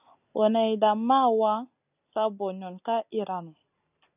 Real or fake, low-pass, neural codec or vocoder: real; 3.6 kHz; none